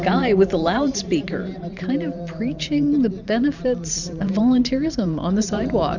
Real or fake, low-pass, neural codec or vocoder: fake; 7.2 kHz; vocoder, 44.1 kHz, 128 mel bands every 512 samples, BigVGAN v2